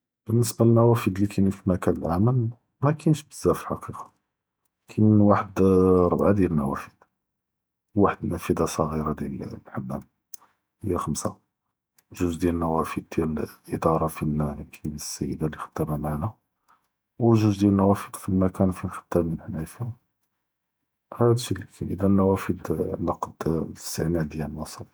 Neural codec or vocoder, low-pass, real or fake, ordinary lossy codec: vocoder, 48 kHz, 128 mel bands, Vocos; none; fake; none